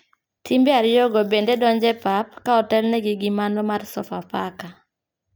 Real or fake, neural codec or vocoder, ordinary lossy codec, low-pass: real; none; none; none